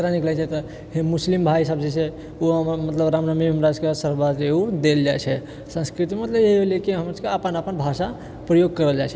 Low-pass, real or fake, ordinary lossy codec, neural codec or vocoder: none; real; none; none